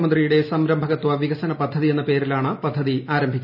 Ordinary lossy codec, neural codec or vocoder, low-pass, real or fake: none; none; 5.4 kHz; real